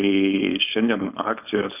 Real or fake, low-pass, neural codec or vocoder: fake; 3.6 kHz; codec, 16 kHz, 4.8 kbps, FACodec